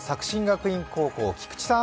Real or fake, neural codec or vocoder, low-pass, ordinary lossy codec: real; none; none; none